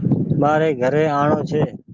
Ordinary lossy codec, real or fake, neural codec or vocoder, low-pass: Opus, 32 kbps; real; none; 7.2 kHz